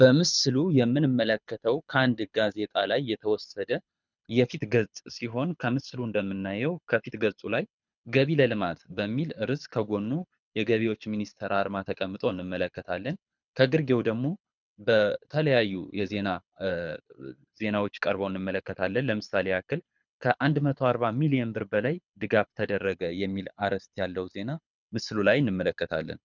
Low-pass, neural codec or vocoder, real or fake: 7.2 kHz; codec, 24 kHz, 6 kbps, HILCodec; fake